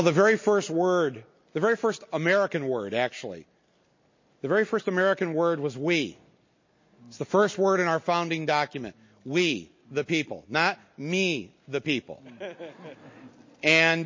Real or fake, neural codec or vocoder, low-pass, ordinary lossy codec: real; none; 7.2 kHz; MP3, 32 kbps